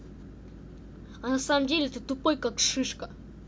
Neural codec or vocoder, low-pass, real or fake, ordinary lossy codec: none; none; real; none